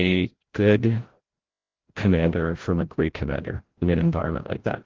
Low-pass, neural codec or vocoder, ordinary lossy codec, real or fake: 7.2 kHz; codec, 16 kHz, 0.5 kbps, FreqCodec, larger model; Opus, 16 kbps; fake